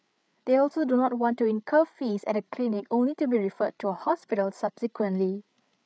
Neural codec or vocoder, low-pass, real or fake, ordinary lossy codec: codec, 16 kHz, 8 kbps, FreqCodec, larger model; none; fake; none